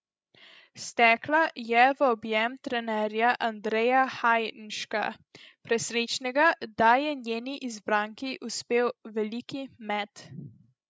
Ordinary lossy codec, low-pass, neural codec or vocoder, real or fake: none; none; codec, 16 kHz, 16 kbps, FreqCodec, larger model; fake